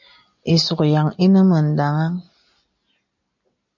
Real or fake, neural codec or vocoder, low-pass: real; none; 7.2 kHz